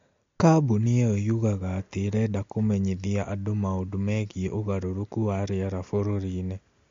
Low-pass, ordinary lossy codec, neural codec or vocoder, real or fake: 7.2 kHz; MP3, 48 kbps; none; real